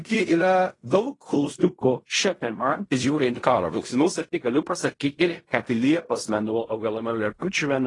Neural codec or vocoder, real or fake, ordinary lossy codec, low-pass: codec, 16 kHz in and 24 kHz out, 0.4 kbps, LongCat-Audio-Codec, fine tuned four codebook decoder; fake; AAC, 32 kbps; 10.8 kHz